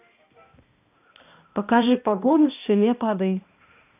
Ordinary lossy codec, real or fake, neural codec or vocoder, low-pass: none; fake; codec, 16 kHz, 1 kbps, X-Codec, HuBERT features, trained on balanced general audio; 3.6 kHz